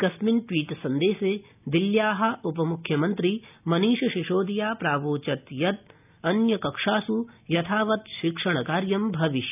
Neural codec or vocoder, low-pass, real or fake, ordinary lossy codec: none; 3.6 kHz; real; none